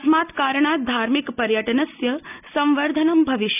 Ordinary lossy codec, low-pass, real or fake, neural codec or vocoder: none; 3.6 kHz; real; none